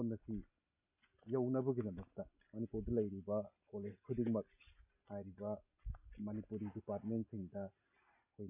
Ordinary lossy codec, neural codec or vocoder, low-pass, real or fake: none; none; 3.6 kHz; real